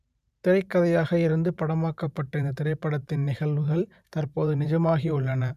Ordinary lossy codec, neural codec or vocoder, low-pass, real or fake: none; vocoder, 44.1 kHz, 128 mel bands every 512 samples, BigVGAN v2; 14.4 kHz; fake